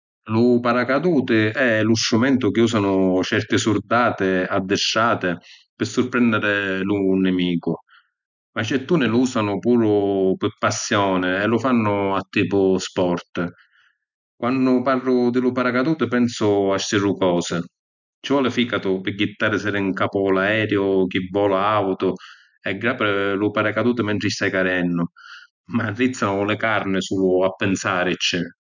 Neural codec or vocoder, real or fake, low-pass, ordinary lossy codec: none; real; 7.2 kHz; none